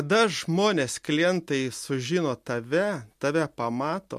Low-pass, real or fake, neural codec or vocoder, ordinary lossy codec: 14.4 kHz; real; none; MP3, 64 kbps